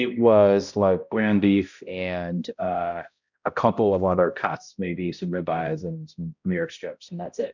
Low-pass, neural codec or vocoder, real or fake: 7.2 kHz; codec, 16 kHz, 0.5 kbps, X-Codec, HuBERT features, trained on balanced general audio; fake